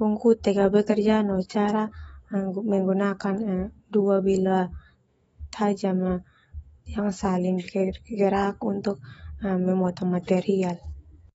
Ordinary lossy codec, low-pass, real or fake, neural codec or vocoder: AAC, 24 kbps; 19.8 kHz; fake; autoencoder, 48 kHz, 128 numbers a frame, DAC-VAE, trained on Japanese speech